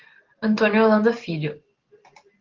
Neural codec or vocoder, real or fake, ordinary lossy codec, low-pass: none; real; Opus, 16 kbps; 7.2 kHz